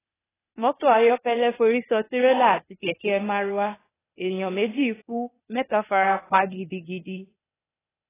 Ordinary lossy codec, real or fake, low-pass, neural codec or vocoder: AAC, 16 kbps; fake; 3.6 kHz; codec, 16 kHz, 0.8 kbps, ZipCodec